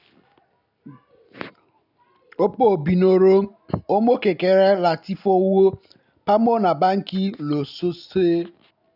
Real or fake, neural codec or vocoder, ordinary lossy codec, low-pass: real; none; none; 5.4 kHz